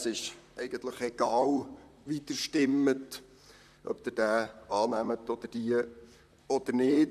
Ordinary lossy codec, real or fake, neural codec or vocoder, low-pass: none; fake; vocoder, 44.1 kHz, 128 mel bands, Pupu-Vocoder; 14.4 kHz